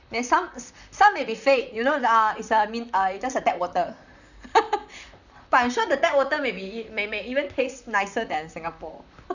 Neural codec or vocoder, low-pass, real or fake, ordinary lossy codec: vocoder, 44.1 kHz, 128 mel bands, Pupu-Vocoder; 7.2 kHz; fake; none